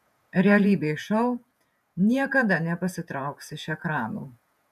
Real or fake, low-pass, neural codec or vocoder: fake; 14.4 kHz; vocoder, 48 kHz, 128 mel bands, Vocos